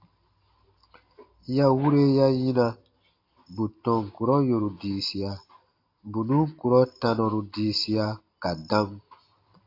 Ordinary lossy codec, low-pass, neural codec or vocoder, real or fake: AAC, 32 kbps; 5.4 kHz; none; real